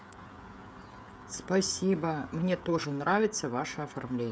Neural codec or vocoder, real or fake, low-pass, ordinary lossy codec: codec, 16 kHz, 16 kbps, FreqCodec, smaller model; fake; none; none